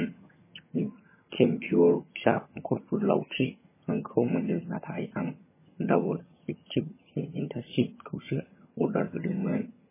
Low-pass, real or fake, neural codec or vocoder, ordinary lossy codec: 3.6 kHz; fake; vocoder, 22.05 kHz, 80 mel bands, HiFi-GAN; MP3, 16 kbps